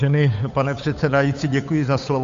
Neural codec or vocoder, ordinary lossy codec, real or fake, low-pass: codec, 16 kHz, 8 kbps, FunCodec, trained on Chinese and English, 25 frames a second; MP3, 48 kbps; fake; 7.2 kHz